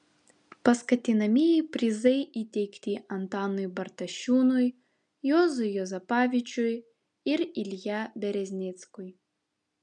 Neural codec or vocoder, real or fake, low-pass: none; real; 9.9 kHz